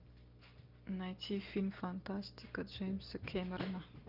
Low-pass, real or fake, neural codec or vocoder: 5.4 kHz; real; none